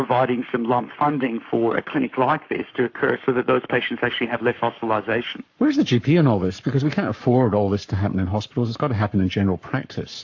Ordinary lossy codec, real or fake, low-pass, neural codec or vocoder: AAC, 48 kbps; fake; 7.2 kHz; codec, 44.1 kHz, 7.8 kbps, Pupu-Codec